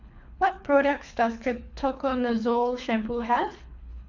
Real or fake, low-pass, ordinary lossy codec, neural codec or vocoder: fake; 7.2 kHz; AAC, 48 kbps; codec, 24 kHz, 3 kbps, HILCodec